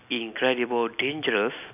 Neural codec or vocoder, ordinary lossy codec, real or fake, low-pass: none; none; real; 3.6 kHz